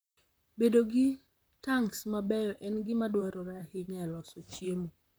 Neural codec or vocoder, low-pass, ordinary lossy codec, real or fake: vocoder, 44.1 kHz, 128 mel bands, Pupu-Vocoder; none; none; fake